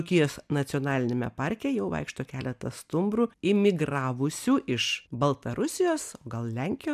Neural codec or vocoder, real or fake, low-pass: none; real; 14.4 kHz